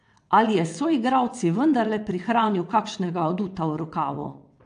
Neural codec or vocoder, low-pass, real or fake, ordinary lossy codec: vocoder, 22.05 kHz, 80 mel bands, WaveNeXt; 9.9 kHz; fake; AAC, 64 kbps